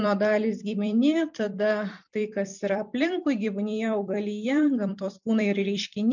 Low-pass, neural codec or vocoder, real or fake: 7.2 kHz; vocoder, 44.1 kHz, 128 mel bands every 256 samples, BigVGAN v2; fake